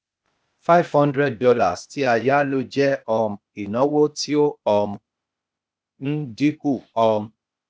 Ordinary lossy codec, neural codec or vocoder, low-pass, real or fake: none; codec, 16 kHz, 0.8 kbps, ZipCodec; none; fake